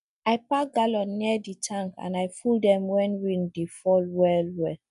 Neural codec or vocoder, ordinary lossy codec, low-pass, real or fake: none; none; 10.8 kHz; real